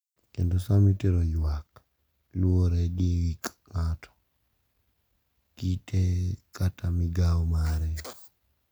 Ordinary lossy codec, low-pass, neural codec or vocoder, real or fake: none; none; none; real